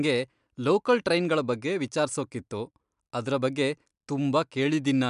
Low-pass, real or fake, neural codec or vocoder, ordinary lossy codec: 10.8 kHz; real; none; MP3, 96 kbps